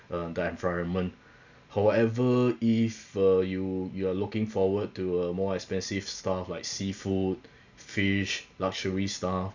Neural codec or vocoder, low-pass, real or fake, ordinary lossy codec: none; 7.2 kHz; real; none